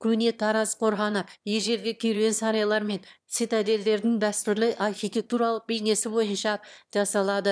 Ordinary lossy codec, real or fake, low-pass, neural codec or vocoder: none; fake; none; autoencoder, 22.05 kHz, a latent of 192 numbers a frame, VITS, trained on one speaker